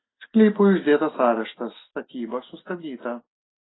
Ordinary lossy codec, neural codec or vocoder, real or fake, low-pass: AAC, 16 kbps; none; real; 7.2 kHz